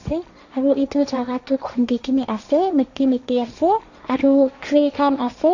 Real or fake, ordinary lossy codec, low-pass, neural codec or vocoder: fake; none; 7.2 kHz; codec, 16 kHz, 1.1 kbps, Voila-Tokenizer